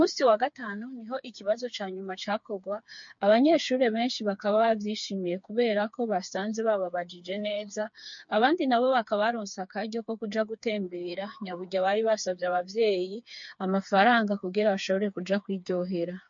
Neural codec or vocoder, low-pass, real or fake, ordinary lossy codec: codec, 16 kHz, 4 kbps, FreqCodec, smaller model; 7.2 kHz; fake; MP3, 48 kbps